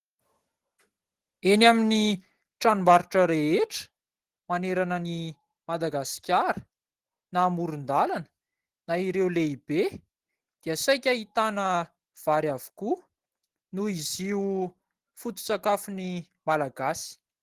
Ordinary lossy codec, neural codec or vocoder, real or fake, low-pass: Opus, 16 kbps; none; real; 14.4 kHz